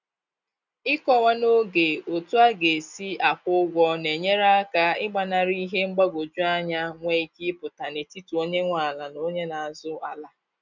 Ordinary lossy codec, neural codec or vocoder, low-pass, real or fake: none; none; 7.2 kHz; real